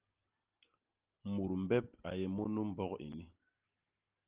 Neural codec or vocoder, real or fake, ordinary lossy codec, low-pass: none; real; Opus, 32 kbps; 3.6 kHz